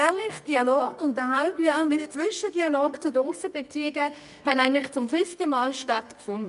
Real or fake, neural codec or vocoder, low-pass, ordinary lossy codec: fake; codec, 24 kHz, 0.9 kbps, WavTokenizer, medium music audio release; 10.8 kHz; none